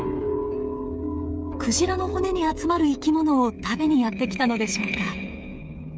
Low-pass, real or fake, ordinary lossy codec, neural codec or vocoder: none; fake; none; codec, 16 kHz, 8 kbps, FreqCodec, smaller model